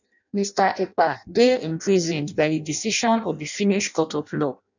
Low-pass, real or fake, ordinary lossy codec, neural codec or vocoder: 7.2 kHz; fake; none; codec, 16 kHz in and 24 kHz out, 0.6 kbps, FireRedTTS-2 codec